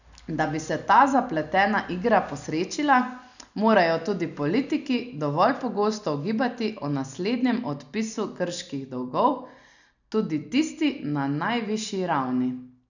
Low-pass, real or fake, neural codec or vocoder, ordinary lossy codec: 7.2 kHz; real; none; none